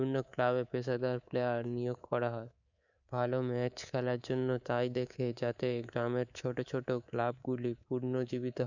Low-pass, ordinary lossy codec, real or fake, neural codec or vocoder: 7.2 kHz; none; fake; codec, 24 kHz, 3.1 kbps, DualCodec